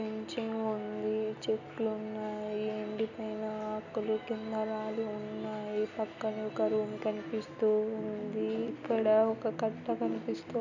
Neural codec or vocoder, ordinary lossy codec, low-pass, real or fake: none; none; 7.2 kHz; real